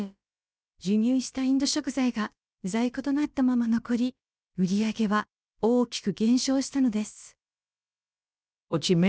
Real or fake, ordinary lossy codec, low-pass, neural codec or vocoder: fake; none; none; codec, 16 kHz, about 1 kbps, DyCAST, with the encoder's durations